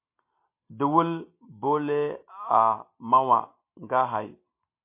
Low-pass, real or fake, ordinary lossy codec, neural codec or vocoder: 3.6 kHz; real; MP3, 24 kbps; none